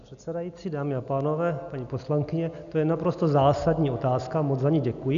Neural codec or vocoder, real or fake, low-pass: none; real; 7.2 kHz